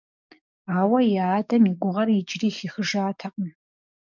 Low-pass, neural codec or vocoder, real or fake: 7.2 kHz; codec, 44.1 kHz, 7.8 kbps, DAC; fake